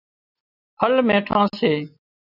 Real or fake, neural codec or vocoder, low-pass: real; none; 5.4 kHz